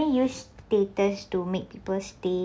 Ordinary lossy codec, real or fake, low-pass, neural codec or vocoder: none; real; none; none